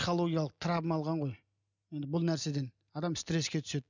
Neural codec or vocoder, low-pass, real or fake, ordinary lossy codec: none; 7.2 kHz; real; none